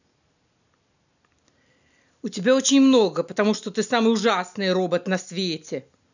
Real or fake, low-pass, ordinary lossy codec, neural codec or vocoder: real; 7.2 kHz; none; none